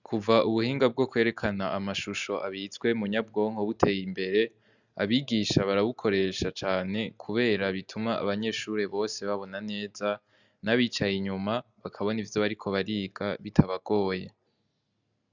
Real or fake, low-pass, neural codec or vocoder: real; 7.2 kHz; none